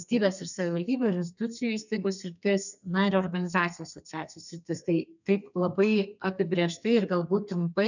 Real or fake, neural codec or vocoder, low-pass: fake; codec, 32 kHz, 1.9 kbps, SNAC; 7.2 kHz